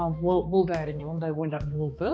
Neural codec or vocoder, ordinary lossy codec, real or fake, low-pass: codec, 16 kHz, 2 kbps, X-Codec, HuBERT features, trained on balanced general audio; none; fake; none